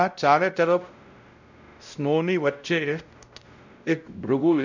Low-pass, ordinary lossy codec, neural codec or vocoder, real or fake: 7.2 kHz; none; codec, 16 kHz, 0.5 kbps, X-Codec, WavLM features, trained on Multilingual LibriSpeech; fake